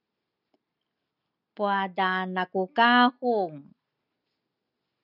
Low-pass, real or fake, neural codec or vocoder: 5.4 kHz; real; none